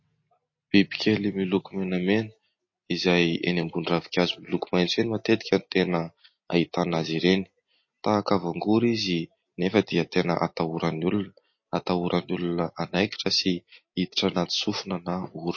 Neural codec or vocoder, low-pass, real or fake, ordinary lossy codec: none; 7.2 kHz; real; MP3, 32 kbps